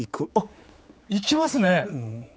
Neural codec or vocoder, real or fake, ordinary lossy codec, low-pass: codec, 16 kHz, 4 kbps, X-Codec, HuBERT features, trained on general audio; fake; none; none